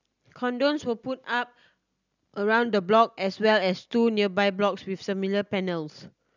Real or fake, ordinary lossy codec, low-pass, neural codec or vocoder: real; none; 7.2 kHz; none